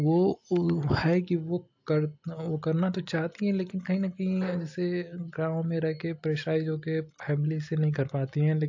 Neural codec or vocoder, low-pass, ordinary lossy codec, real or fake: none; 7.2 kHz; AAC, 48 kbps; real